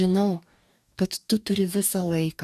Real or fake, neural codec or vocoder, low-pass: fake; codec, 44.1 kHz, 2.6 kbps, DAC; 14.4 kHz